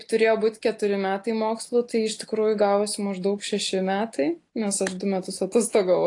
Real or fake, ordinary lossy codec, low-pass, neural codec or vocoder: real; AAC, 48 kbps; 10.8 kHz; none